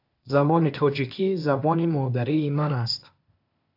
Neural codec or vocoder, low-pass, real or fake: codec, 16 kHz, 0.8 kbps, ZipCodec; 5.4 kHz; fake